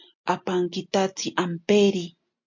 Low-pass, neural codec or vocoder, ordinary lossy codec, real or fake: 7.2 kHz; none; MP3, 48 kbps; real